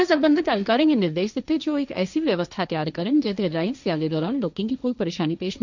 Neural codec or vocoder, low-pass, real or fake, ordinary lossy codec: codec, 16 kHz, 1.1 kbps, Voila-Tokenizer; 7.2 kHz; fake; none